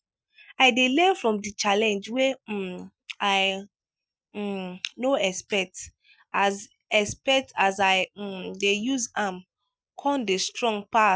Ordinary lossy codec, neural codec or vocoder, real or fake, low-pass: none; none; real; none